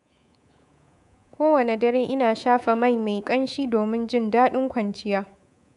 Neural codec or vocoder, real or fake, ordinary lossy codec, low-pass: codec, 24 kHz, 3.1 kbps, DualCodec; fake; none; 10.8 kHz